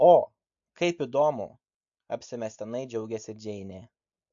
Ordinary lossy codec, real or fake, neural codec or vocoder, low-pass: MP3, 48 kbps; real; none; 7.2 kHz